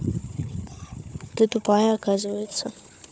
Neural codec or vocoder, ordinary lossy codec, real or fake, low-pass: codec, 16 kHz, 4 kbps, FunCodec, trained on Chinese and English, 50 frames a second; none; fake; none